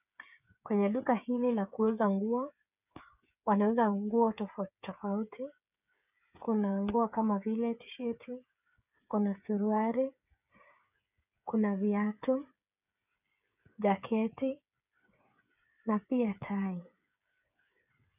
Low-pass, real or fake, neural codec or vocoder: 3.6 kHz; fake; codec, 16 kHz, 8 kbps, FreqCodec, smaller model